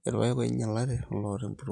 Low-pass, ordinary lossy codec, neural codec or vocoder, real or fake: 10.8 kHz; none; vocoder, 44.1 kHz, 128 mel bands every 512 samples, BigVGAN v2; fake